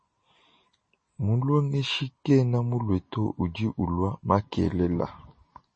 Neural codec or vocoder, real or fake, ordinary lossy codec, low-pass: none; real; MP3, 32 kbps; 9.9 kHz